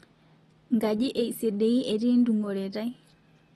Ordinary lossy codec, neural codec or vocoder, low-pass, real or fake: AAC, 32 kbps; none; 19.8 kHz; real